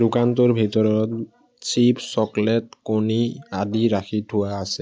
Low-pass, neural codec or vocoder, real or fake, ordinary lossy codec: none; none; real; none